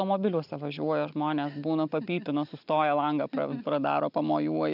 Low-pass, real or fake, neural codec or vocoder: 5.4 kHz; real; none